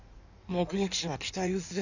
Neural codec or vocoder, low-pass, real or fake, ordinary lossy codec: codec, 16 kHz in and 24 kHz out, 1.1 kbps, FireRedTTS-2 codec; 7.2 kHz; fake; none